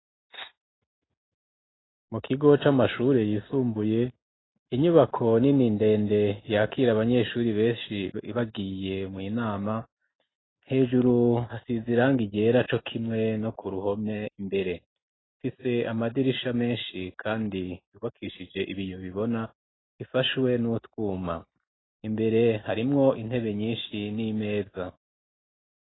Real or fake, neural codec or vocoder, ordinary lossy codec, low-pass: real; none; AAC, 16 kbps; 7.2 kHz